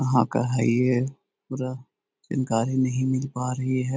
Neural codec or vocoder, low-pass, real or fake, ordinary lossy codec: none; none; real; none